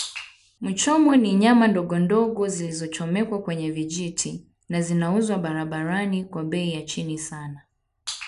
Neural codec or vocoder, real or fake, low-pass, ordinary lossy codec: none; real; 10.8 kHz; none